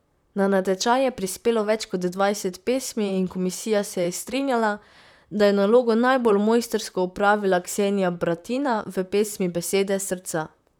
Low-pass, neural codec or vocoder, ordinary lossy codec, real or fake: none; vocoder, 44.1 kHz, 128 mel bands, Pupu-Vocoder; none; fake